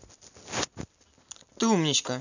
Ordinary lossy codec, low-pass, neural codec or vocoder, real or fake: none; 7.2 kHz; none; real